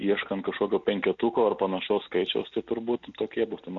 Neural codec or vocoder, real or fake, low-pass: none; real; 7.2 kHz